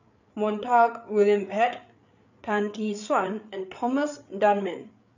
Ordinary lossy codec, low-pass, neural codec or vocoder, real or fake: none; 7.2 kHz; codec, 16 kHz, 8 kbps, FreqCodec, larger model; fake